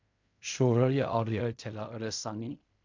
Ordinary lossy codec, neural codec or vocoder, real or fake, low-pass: MP3, 64 kbps; codec, 16 kHz in and 24 kHz out, 0.4 kbps, LongCat-Audio-Codec, fine tuned four codebook decoder; fake; 7.2 kHz